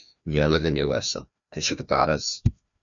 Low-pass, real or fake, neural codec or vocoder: 7.2 kHz; fake; codec, 16 kHz, 1 kbps, FreqCodec, larger model